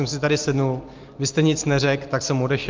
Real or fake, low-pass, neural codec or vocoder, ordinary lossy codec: real; 7.2 kHz; none; Opus, 32 kbps